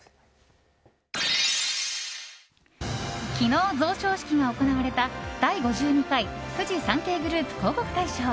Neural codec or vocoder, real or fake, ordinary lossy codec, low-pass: none; real; none; none